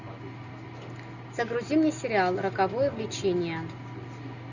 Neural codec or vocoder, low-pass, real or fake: none; 7.2 kHz; real